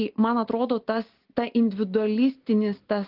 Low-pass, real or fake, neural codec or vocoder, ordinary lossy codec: 5.4 kHz; real; none; Opus, 16 kbps